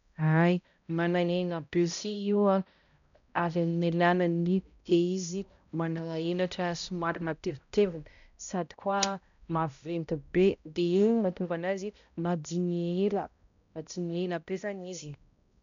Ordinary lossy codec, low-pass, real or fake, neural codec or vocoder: none; 7.2 kHz; fake; codec, 16 kHz, 0.5 kbps, X-Codec, HuBERT features, trained on balanced general audio